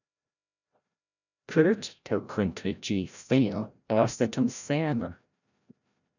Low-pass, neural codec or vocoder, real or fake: 7.2 kHz; codec, 16 kHz, 0.5 kbps, FreqCodec, larger model; fake